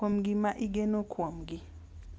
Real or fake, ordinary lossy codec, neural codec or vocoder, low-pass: real; none; none; none